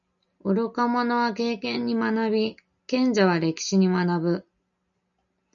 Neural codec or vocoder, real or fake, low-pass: none; real; 7.2 kHz